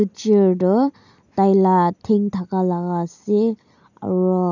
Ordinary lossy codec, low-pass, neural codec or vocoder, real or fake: none; 7.2 kHz; none; real